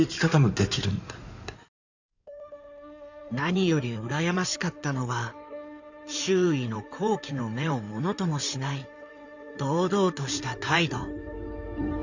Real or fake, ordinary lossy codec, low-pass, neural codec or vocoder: fake; none; 7.2 kHz; codec, 16 kHz in and 24 kHz out, 2.2 kbps, FireRedTTS-2 codec